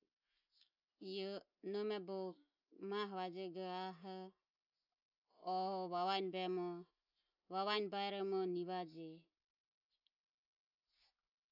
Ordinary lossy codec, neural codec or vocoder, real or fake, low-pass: none; none; real; 5.4 kHz